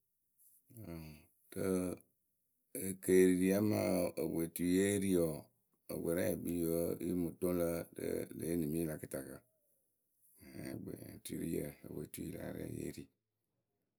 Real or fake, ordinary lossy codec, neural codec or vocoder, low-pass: real; none; none; none